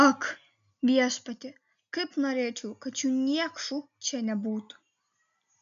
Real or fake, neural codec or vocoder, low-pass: real; none; 7.2 kHz